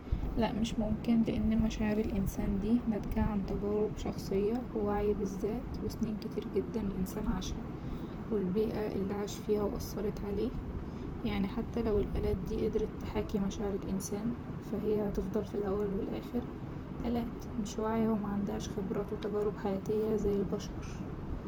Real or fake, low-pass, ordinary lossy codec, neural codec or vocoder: fake; none; none; vocoder, 44.1 kHz, 128 mel bands, Pupu-Vocoder